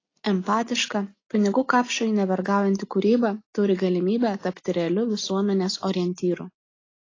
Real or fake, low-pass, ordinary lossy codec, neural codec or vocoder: real; 7.2 kHz; AAC, 32 kbps; none